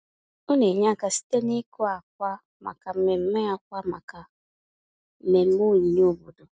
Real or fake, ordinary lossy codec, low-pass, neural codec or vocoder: real; none; none; none